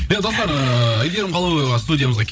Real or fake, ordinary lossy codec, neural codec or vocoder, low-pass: fake; none; codec, 16 kHz, 16 kbps, FreqCodec, larger model; none